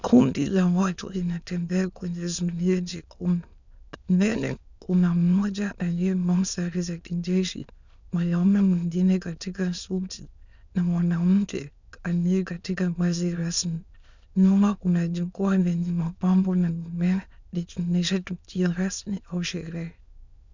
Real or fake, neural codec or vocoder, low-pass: fake; autoencoder, 22.05 kHz, a latent of 192 numbers a frame, VITS, trained on many speakers; 7.2 kHz